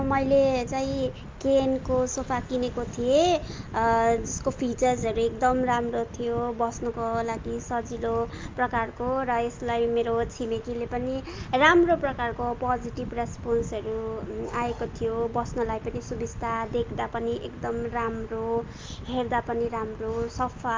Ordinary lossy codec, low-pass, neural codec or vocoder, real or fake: Opus, 24 kbps; 7.2 kHz; none; real